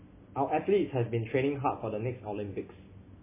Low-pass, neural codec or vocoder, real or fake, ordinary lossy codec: 3.6 kHz; none; real; MP3, 16 kbps